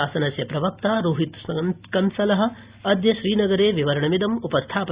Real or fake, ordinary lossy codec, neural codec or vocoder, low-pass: real; Opus, 64 kbps; none; 3.6 kHz